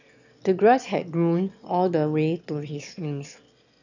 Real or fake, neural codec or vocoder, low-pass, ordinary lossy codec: fake; autoencoder, 22.05 kHz, a latent of 192 numbers a frame, VITS, trained on one speaker; 7.2 kHz; none